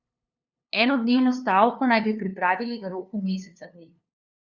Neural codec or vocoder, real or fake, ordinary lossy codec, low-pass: codec, 16 kHz, 2 kbps, FunCodec, trained on LibriTTS, 25 frames a second; fake; Opus, 64 kbps; 7.2 kHz